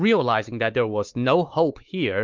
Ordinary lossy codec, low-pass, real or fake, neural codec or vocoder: Opus, 32 kbps; 7.2 kHz; fake; codec, 16 kHz, 4 kbps, X-Codec, WavLM features, trained on Multilingual LibriSpeech